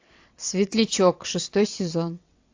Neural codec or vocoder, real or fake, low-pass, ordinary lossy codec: vocoder, 22.05 kHz, 80 mel bands, WaveNeXt; fake; 7.2 kHz; AAC, 48 kbps